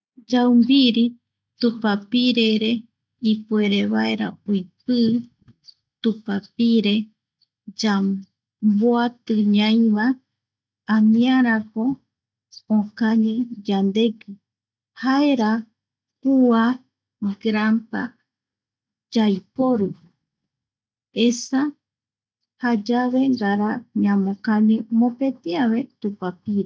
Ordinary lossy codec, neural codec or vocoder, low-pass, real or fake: none; none; none; real